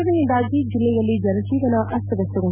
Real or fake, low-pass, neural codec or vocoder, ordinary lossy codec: real; 3.6 kHz; none; none